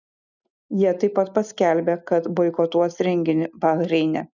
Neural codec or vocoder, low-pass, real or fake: none; 7.2 kHz; real